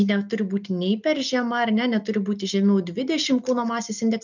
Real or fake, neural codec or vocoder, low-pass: real; none; 7.2 kHz